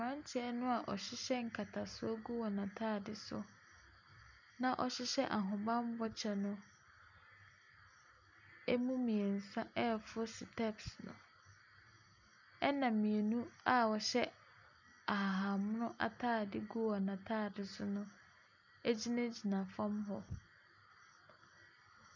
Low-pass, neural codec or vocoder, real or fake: 7.2 kHz; none; real